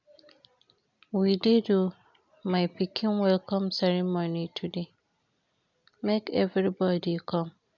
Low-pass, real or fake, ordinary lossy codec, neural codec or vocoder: 7.2 kHz; real; none; none